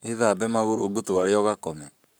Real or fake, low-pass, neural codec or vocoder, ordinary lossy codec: fake; none; codec, 44.1 kHz, 7.8 kbps, Pupu-Codec; none